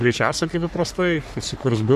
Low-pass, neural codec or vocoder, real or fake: 14.4 kHz; codec, 44.1 kHz, 3.4 kbps, Pupu-Codec; fake